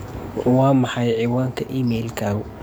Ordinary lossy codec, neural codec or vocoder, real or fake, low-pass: none; codec, 44.1 kHz, 7.8 kbps, DAC; fake; none